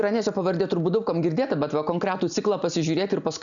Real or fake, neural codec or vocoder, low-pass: real; none; 7.2 kHz